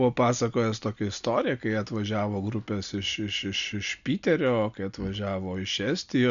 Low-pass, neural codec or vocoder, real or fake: 7.2 kHz; none; real